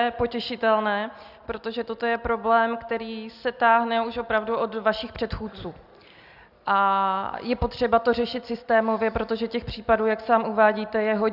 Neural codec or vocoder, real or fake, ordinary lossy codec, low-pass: none; real; Opus, 64 kbps; 5.4 kHz